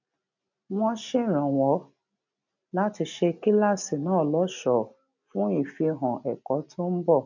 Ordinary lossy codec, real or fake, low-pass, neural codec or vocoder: none; real; 7.2 kHz; none